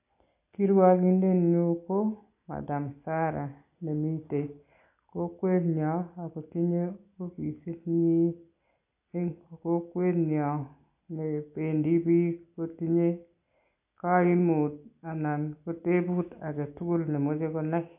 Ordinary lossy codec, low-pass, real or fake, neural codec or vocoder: AAC, 32 kbps; 3.6 kHz; real; none